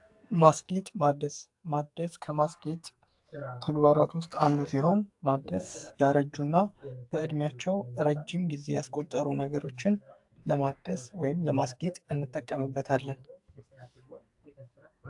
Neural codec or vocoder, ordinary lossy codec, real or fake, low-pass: codec, 44.1 kHz, 2.6 kbps, SNAC; AAC, 64 kbps; fake; 10.8 kHz